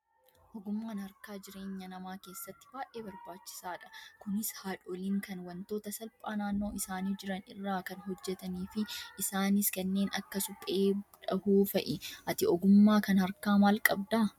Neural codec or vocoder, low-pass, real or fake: none; 19.8 kHz; real